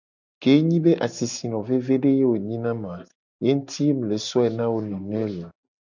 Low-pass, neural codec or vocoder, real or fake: 7.2 kHz; none; real